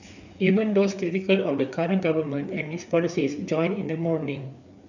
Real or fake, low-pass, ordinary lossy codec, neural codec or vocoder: fake; 7.2 kHz; none; codec, 16 kHz, 4 kbps, FreqCodec, larger model